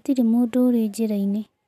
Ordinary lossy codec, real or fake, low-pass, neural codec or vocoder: none; real; 14.4 kHz; none